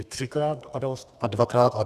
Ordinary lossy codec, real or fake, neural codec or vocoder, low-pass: Opus, 64 kbps; fake; codec, 32 kHz, 1.9 kbps, SNAC; 14.4 kHz